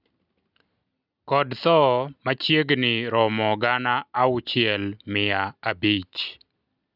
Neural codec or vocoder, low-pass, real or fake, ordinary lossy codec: none; 5.4 kHz; real; none